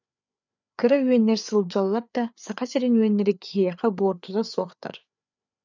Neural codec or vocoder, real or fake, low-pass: codec, 16 kHz, 4 kbps, FreqCodec, larger model; fake; 7.2 kHz